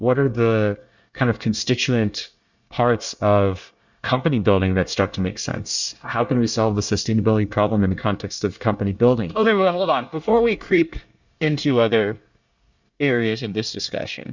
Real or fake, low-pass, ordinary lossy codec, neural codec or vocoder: fake; 7.2 kHz; Opus, 64 kbps; codec, 24 kHz, 1 kbps, SNAC